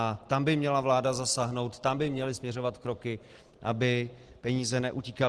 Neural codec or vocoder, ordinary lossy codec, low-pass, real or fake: none; Opus, 16 kbps; 10.8 kHz; real